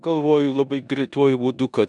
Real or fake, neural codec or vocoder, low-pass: fake; codec, 16 kHz in and 24 kHz out, 0.9 kbps, LongCat-Audio-Codec, four codebook decoder; 10.8 kHz